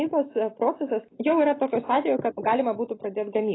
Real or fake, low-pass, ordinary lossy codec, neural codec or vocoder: real; 7.2 kHz; AAC, 16 kbps; none